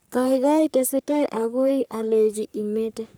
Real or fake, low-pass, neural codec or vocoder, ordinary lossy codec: fake; none; codec, 44.1 kHz, 3.4 kbps, Pupu-Codec; none